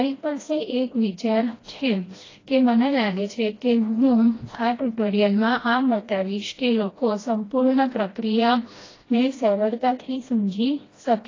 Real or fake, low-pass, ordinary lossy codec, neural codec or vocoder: fake; 7.2 kHz; AAC, 32 kbps; codec, 16 kHz, 1 kbps, FreqCodec, smaller model